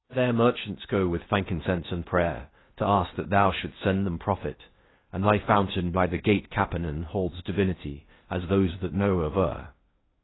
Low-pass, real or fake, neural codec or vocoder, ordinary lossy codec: 7.2 kHz; fake; codec, 16 kHz in and 24 kHz out, 0.8 kbps, FocalCodec, streaming, 65536 codes; AAC, 16 kbps